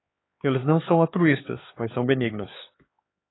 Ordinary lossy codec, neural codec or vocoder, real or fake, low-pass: AAC, 16 kbps; codec, 16 kHz, 4 kbps, X-Codec, HuBERT features, trained on LibriSpeech; fake; 7.2 kHz